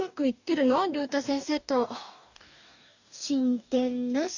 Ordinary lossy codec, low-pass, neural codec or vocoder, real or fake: none; 7.2 kHz; codec, 44.1 kHz, 2.6 kbps, DAC; fake